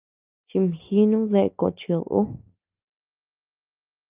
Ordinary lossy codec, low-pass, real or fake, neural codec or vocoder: Opus, 24 kbps; 3.6 kHz; real; none